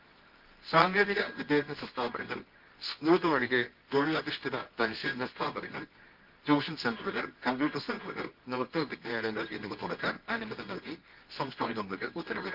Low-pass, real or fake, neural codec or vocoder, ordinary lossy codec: 5.4 kHz; fake; codec, 24 kHz, 0.9 kbps, WavTokenizer, medium music audio release; Opus, 32 kbps